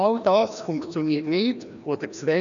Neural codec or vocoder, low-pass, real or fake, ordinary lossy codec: codec, 16 kHz, 1 kbps, FreqCodec, larger model; 7.2 kHz; fake; none